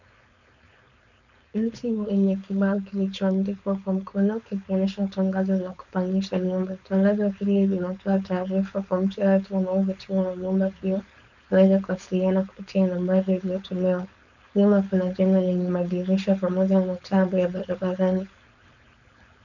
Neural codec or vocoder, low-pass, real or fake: codec, 16 kHz, 4.8 kbps, FACodec; 7.2 kHz; fake